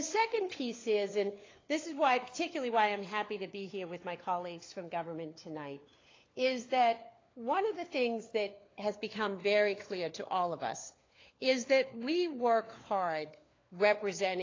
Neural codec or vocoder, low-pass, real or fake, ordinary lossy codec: codec, 16 kHz, 4 kbps, FunCodec, trained on LibriTTS, 50 frames a second; 7.2 kHz; fake; AAC, 32 kbps